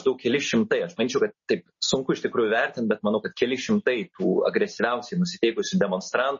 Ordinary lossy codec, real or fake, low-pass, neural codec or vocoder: MP3, 32 kbps; real; 7.2 kHz; none